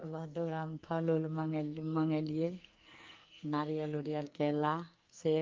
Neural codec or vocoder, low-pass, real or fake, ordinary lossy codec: codec, 44.1 kHz, 2.6 kbps, SNAC; 7.2 kHz; fake; Opus, 32 kbps